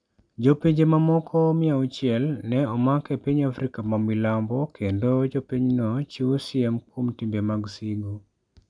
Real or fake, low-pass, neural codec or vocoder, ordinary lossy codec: real; 9.9 kHz; none; none